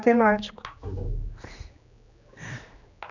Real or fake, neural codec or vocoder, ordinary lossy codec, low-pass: fake; codec, 16 kHz, 2 kbps, X-Codec, HuBERT features, trained on general audio; none; 7.2 kHz